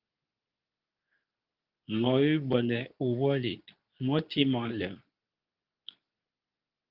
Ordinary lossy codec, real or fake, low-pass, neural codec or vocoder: Opus, 24 kbps; fake; 5.4 kHz; codec, 24 kHz, 0.9 kbps, WavTokenizer, medium speech release version 2